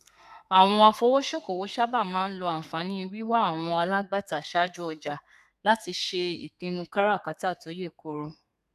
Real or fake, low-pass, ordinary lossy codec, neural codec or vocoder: fake; 14.4 kHz; none; codec, 32 kHz, 1.9 kbps, SNAC